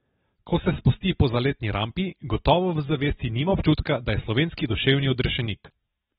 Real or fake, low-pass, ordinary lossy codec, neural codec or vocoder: real; 19.8 kHz; AAC, 16 kbps; none